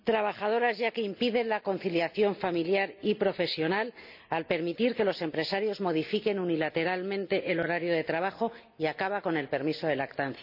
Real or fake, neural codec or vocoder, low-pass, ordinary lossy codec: real; none; 5.4 kHz; none